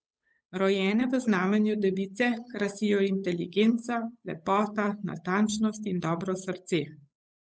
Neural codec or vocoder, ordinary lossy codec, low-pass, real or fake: codec, 16 kHz, 8 kbps, FunCodec, trained on Chinese and English, 25 frames a second; none; none; fake